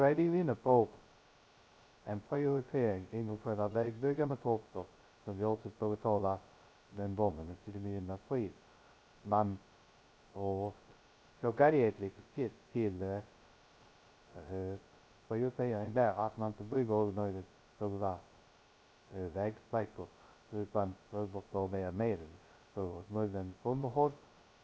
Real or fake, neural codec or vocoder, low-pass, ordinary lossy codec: fake; codec, 16 kHz, 0.2 kbps, FocalCodec; none; none